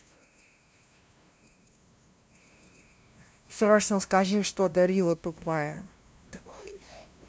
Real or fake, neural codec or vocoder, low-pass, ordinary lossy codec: fake; codec, 16 kHz, 0.5 kbps, FunCodec, trained on LibriTTS, 25 frames a second; none; none